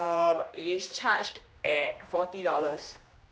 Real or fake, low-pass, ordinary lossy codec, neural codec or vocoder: fake; none; none; codec, 16 kHz, 1 kbps, X-Codec, HuBERT features, trained on general audio